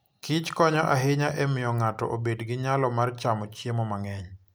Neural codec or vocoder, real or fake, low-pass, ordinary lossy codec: none; real; none; none